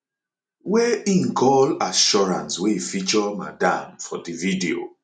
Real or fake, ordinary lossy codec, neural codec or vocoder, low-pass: real; none; none; 9.9 kHz